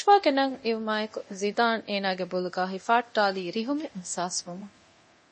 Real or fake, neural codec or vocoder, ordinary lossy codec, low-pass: fake; codec, 24 kHz, 0.9 kbps, DualCodec; MP3, 32 kbps; 10.8 kHz